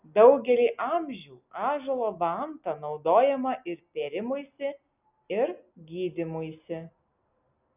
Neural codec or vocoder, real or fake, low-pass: none; real; 3.6 kHz